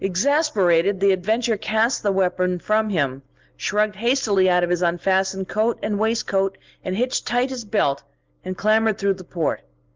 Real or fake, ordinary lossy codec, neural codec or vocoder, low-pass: real; Opus, 32 kbps; none; 7.2 kHz